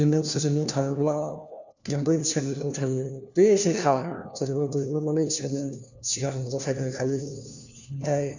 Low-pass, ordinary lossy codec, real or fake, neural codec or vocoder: 7.2 kHz; none; fake; codec, 16 kHz, 1 kbps, FunCodec, trained on LibriTTS, 50 frames a second